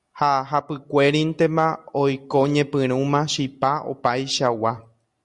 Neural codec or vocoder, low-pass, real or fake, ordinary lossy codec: none; 10.8 kHz; real; Opus, 64 kbps